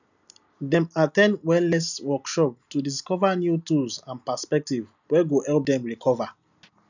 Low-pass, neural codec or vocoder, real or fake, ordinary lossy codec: 7.2 kHz; none; real; none